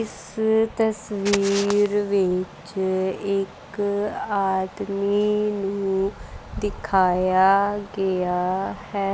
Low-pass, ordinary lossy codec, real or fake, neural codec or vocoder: none; none; real; none